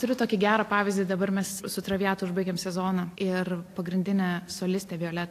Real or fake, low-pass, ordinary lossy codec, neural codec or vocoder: real; 14.4 kHz; AAC, 64 kbps; none